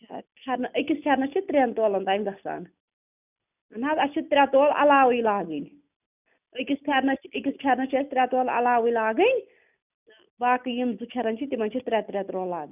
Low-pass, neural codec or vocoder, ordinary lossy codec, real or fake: 3.6 kHz; none; none; real